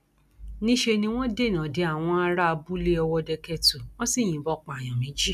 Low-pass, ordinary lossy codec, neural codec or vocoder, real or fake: 14.4 kHz; none; none; real